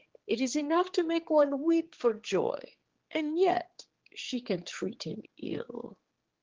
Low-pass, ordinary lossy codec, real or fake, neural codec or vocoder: 7.2 kHz; Opus, 16 kbps; fake; codec, 16 kHz, 4 kbps, X-Codec, HuBERT features, trained on general audio